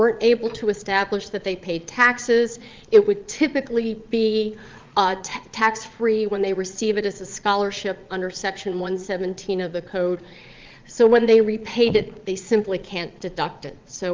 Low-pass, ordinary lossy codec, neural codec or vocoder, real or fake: 7.2 kHz; Opus, 24 kbps; vocoder, 22.05 kHz, 80 mel bands, Vocos; fake